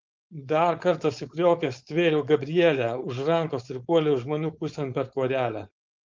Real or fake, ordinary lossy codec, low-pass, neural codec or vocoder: fake; Opus, 32 kbps; 7.2 kHz; codec, 16 kHz, 4.8 kbps, FACodec